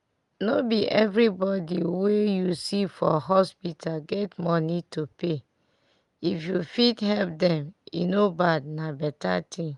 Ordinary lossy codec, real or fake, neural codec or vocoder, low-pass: Opus, 32 kbps; real; none; 10.8 kHz